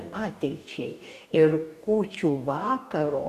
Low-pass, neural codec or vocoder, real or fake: 14.4 kHz; codec, 44.1 kHz, 2.6 kbps, DAC; fake